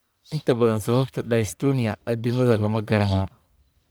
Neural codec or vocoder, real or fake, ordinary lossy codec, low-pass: codec, 44.1 kHz, 1.7 kbps, Pupu-Codec; fake; none; none